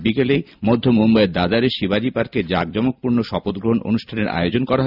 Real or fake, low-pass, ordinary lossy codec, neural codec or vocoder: real; 5.4 kHz; none; none